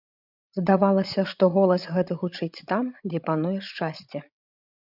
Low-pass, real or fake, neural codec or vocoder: 5.4 kHz; fake; codec, 16 kHz, 16 kbps, FreqCodec, larger model